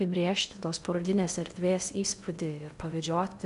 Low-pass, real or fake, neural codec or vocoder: 10.8 kHz; fake; codec, 16 kHz in and 24 kHz out, 0.6 kbps, FocalCodec, streaming, 2048 codes